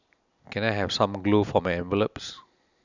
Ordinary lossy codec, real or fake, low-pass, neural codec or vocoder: none; real; 7.2 kHz; none